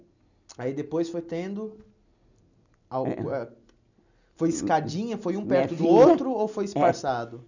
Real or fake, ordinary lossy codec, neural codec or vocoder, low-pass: real; none; none; 7.2 kHz